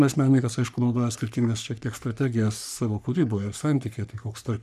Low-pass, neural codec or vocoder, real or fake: 14.4 kHz; codec, 44.1 kHz, 3.4 kbps, Pupu-Codec; fake